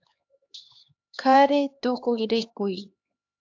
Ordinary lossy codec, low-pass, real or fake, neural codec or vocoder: AAC, 48 kbps; 7.2 kHz; fake; codec, 16 kHz, 2 kbps, X-Codec, HuBERT features, trained on LibriSpeech